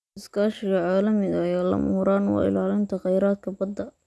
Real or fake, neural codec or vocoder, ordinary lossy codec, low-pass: real; none; none; none